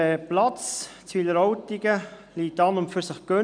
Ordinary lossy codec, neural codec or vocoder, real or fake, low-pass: none; none; real; 9.9 kHz